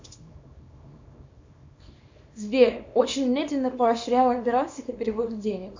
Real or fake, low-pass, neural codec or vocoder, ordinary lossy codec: fake; 7.2 kHz; codec, 24 kHz, 0.9 kbps, WavTokenizer, small release; MP3, 64 kbps